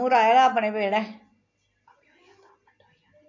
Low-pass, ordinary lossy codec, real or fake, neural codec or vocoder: 7.2 kHz; AAC, 48 kbps; real; none